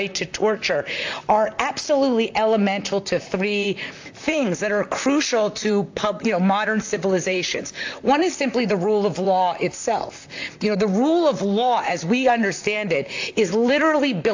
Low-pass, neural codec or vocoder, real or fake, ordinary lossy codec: 7.2 kHz; vocoder, 44.1 kHz, 128 mel bands, Pupu-Vocoder; fake; AAC, 48 kbps